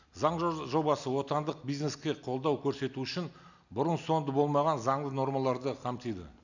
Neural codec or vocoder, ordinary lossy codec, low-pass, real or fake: none; none; 7.2 kHz; real